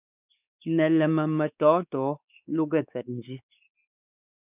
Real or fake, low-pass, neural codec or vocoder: fake; 3.6 kHz; codec, 16 kHz, 4 kbps, X-Codec, WavLM features, trained on Multilingual LibriSpeech